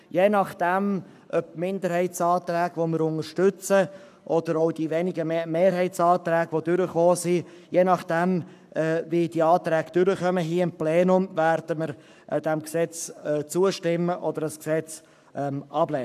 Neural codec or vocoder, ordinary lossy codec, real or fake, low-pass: codec, 44.1 kHz, 7.8 kbps, Pupu-Codec; none; fake; 14.4 kHz